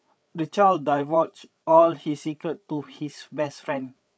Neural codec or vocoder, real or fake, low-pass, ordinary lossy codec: codec, 16 kHz, 8 kbps, FreqCodec, larger model; fake; none; none